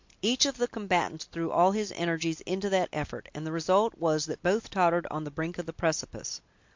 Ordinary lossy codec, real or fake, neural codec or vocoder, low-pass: MP3, 48 kbps; real; none; 7.2 kHz